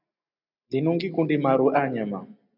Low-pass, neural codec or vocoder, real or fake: 5.4 kHz; none; real